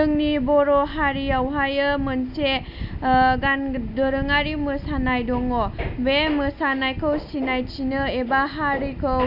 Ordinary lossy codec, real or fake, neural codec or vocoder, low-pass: none; real; none; 5.4 kHz